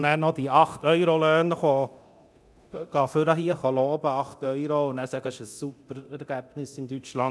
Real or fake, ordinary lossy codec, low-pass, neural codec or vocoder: fake; none; none; codec, 24 kHz, 0.9 kbps, DualCodec